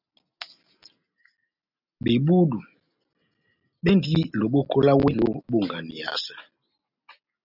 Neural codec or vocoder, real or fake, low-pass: none; real; 5.4 kHz